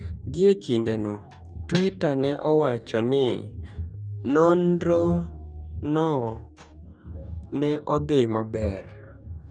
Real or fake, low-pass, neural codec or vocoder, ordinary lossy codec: fake; 9.9 kHz; codec, 44.1 kHz, 2.6 kbps, DAC; none